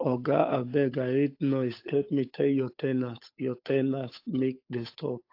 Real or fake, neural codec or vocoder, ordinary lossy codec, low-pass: fake; codec, 16 kHz, 8 kbps, FunCodec, trained on Chinese and English, 25 frames a second; AAC, 32 kbps; 5.4 kHz